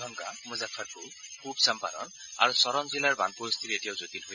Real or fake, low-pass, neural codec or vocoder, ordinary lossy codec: real; 7.2 kHz; none; none